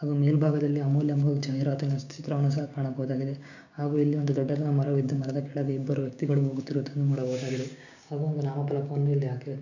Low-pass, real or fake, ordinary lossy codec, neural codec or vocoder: 7.2 kHz; fake; none; codec, 16 kHz, 6 kbps, DAC